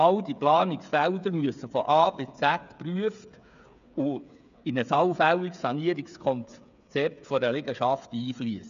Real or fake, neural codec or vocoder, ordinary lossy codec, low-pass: fake; codec, 16 kHz, 8 kbps, FreqCodec, smaller model; none; 7.2 kHz